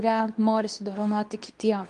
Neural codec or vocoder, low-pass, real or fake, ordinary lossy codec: codec, 24 kHz, 0.9 kbps, WavTokenizer, medium speech release version 2; 10.8 kHz; fake; Opus, 32 kbps